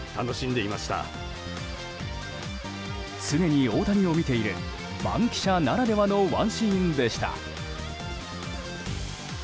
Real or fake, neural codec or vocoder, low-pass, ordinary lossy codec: real; none; none; none